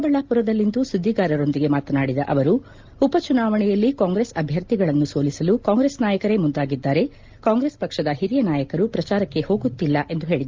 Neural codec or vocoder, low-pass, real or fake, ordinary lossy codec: none; 7.2 kHz; real; Opus, 24 kbps